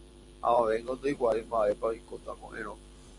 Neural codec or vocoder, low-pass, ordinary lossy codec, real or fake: vocoder, 44.1 kHz, 128 mel bands every 512 samples, BigVGAN v2; 10.8 kHz; Opus, 64 kbps; fake